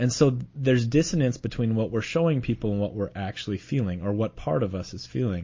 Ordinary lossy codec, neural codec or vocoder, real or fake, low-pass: MP3, 32 kbps; none; real; 7.2 kHz